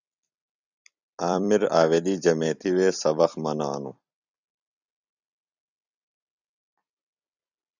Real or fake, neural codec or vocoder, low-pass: fake; vocoder, 44.1 kHz, 128 mel bands every 256 samples, BigVGAN v2; 7.2 kHz